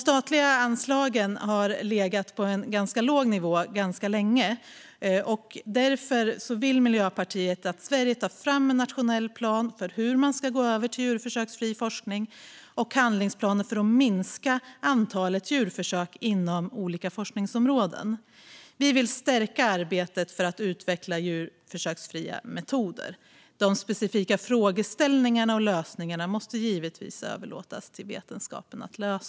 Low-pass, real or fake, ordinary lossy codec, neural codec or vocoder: none; real; none; none